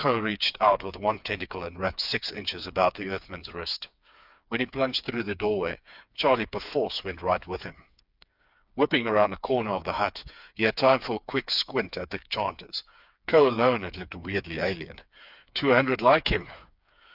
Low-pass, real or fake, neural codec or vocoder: 5.4 kHz; fake; codec, 16 kHz, 4 kbps, FreqCodec, smaller model